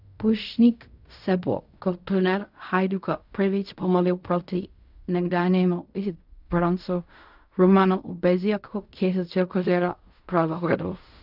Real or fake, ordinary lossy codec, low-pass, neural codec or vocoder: fake; none; 5.4 kHz; codec, 16 kHz in and 24 kHz out, 0.4 kbps, LongCat-Audio-Codec, fine tuned four codebook decoder